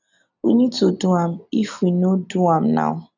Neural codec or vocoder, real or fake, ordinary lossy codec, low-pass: none; real; none; 7.2 kHz